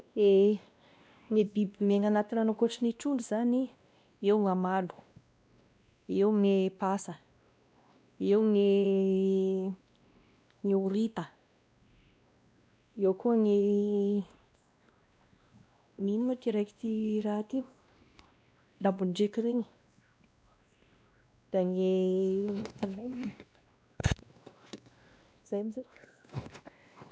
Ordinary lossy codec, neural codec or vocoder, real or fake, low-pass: none; codec, 16 kHz, 1 kbps, X-Codec, WavLM features, trained on Multilingual LibriSpeech; fake; none